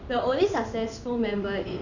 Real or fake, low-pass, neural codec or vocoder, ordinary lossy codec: fake; 7.2 kHz; codec, 16 kHz in and 24 kHz out, 1 kbps, XY-Tokenizer; none